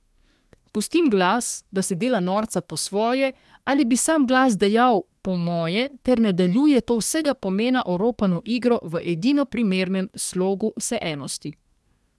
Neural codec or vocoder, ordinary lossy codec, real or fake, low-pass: codec, 24 kHz, 1 kbps, SNAC; none; fake; none